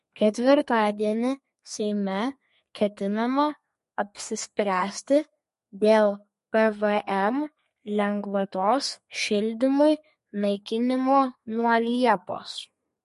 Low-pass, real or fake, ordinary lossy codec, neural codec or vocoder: 14.4 kHz; fake; MP3, 48 kbps; codec, 32 kHz, 1.9 kbps, SNAC